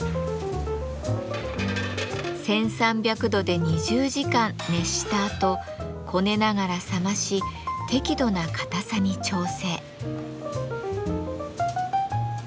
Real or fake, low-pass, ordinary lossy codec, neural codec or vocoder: real; none; none; none